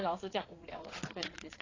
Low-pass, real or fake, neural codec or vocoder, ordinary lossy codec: 7.2 kHz; fake; vocoder, 22.05 kHz, 80 mel bands, Vocos; AAC, 32 kbps